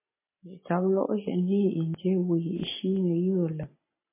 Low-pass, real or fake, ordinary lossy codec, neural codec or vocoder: 3.6 kHz; real; MP3, 16 kbps; none